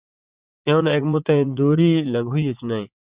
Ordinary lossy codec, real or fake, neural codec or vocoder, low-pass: Opus, 64 kbps; fake; vocoder, 44.1 kHz, 80 mel bands, Vocos; 3.6 kHz